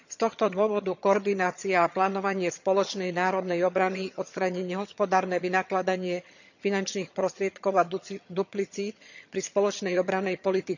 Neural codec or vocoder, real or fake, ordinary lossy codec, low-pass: vocoder, 22.05 kHz, 80 mel bands, HiFi-GAN; fake; none; 7.2 kHz